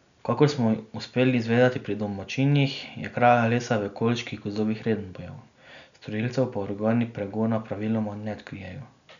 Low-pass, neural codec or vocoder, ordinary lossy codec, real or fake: 7.2 kHz; none; none; real